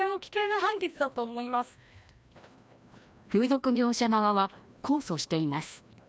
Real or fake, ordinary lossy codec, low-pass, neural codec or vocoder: fake; none; none; codec, 16 kHz, 1 kbps, FreqCodec, larger model